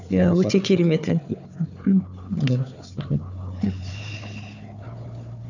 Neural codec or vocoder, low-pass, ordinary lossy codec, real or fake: codec, 16 kHz, 4 kbps, FunCodec, trained on Chinese and English, 50 frames a second; 7.2 kHz; none; fake